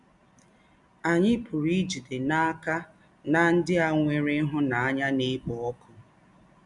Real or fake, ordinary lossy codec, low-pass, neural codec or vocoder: real; none; 10.8 kHz; none